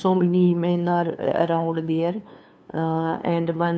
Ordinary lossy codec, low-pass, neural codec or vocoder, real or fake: none; none; codec, 16 kHz, 2 kbps, FunCodec, trained on LibriTTS, 25 frames a second; fake